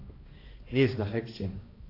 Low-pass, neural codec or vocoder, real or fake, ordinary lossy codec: 5.4 kHz; codec, 16 kHz, 1 kbps, X-Codec, HuBERT features, trained on balanced general audio; fake; AAC, 24 kbps